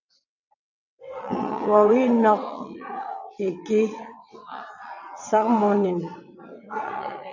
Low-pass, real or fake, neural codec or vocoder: 7.2 kHz; fake; vocoder, 22.05 kHz, 80 mel bands, WaveNeXt